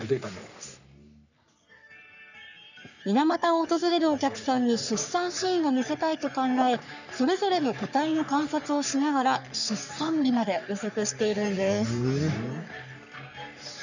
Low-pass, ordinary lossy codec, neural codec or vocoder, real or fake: 7.2 kHz; none; codec, 44.1 kHz, 3.4 kbps, Pupu-Codec; fake